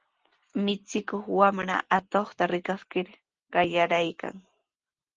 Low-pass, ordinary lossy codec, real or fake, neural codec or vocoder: 7.2 kHz; Opus, 16 kbps; real; none